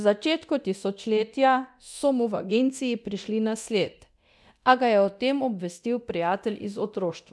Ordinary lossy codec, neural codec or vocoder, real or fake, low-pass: none; codec, 24 kHz, 0.9 kbps, DualCodec; fake; none